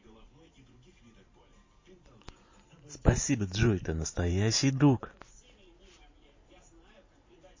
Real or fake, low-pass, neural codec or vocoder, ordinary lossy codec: fake; 7.2 kHz; vocoder, 44.1 kHz, 128 mel bands every 512 samples, BigVGAN v2; MP3, 32 kbps